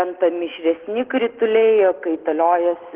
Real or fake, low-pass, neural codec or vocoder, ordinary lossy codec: real; 3.6 kHz; none; Opus, 16 kbps